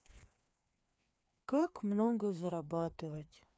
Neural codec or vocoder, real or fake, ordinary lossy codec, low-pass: codec, 16 kHz, 2 kbps, FreqCodec, larger model; fake; none; none